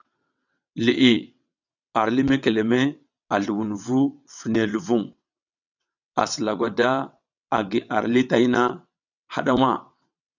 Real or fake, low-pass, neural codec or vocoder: fake; 7.2 kHz; vocoder, 22.05 kHz, 80 mel bands, WaveNeXt